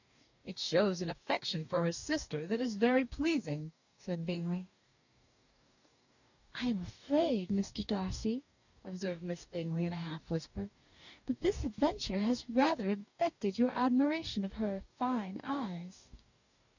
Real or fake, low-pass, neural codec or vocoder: fake; 7.2 kHz; codec, 44.1 kHz, 2.6 kbps, DAC